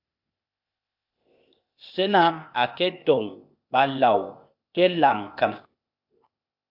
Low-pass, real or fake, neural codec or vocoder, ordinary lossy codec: 5.4 kHz; fake; codec, 16 kHz, 0.8 kbps, ZipCodec; AAC, 48 kbps